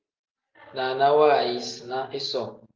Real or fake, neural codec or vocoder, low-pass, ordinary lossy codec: real; none; 7.2 kHz; Opus, 24 kbps